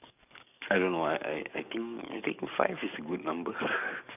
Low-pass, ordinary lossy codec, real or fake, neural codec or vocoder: 3.6 kHz; none; fake; codec, 44.1 kHz, 7.8 kbps, DAC